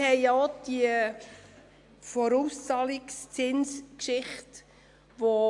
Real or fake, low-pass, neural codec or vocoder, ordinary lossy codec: real; 10.8 kHz; none; AAC, 64 kbps